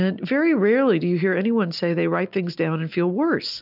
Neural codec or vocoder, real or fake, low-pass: none; real; 5.4 kHz